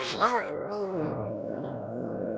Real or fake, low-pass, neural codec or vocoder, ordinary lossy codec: fake; none; codec, 16 kHz, 1 kbps, X-Codec, WavLM features, trained on Multilingual LibriSpeech; none